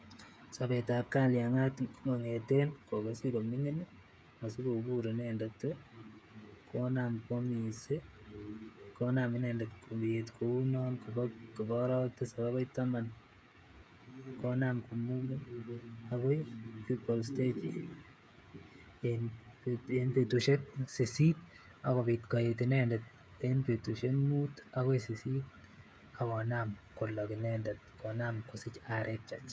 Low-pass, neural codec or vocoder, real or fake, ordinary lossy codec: none; codec, 16 kHz, 16 kbps, FreqCodec, smaller model; fake; none